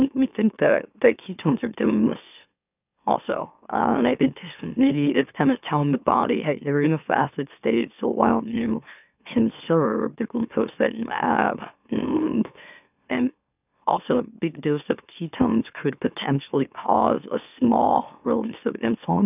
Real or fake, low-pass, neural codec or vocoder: fake; 3.6 kHz; autoencoder, 44.1 kHz, a latent of 192 numbers a frame, MeloTTS